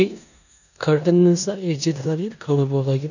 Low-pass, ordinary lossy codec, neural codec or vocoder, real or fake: 7.2 kHz; none; codec, 16 kHz in and 24 kHz out, 0.9 kbps, LongCat-Audio-Codec, four codebook decoder; fake